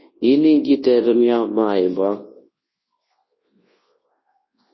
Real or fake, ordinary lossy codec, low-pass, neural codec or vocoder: fake; MP3, 24 kbps; 7.2 kHz; codec, 24 kHz, 0.9 kbps, WavTokenizer, large speech release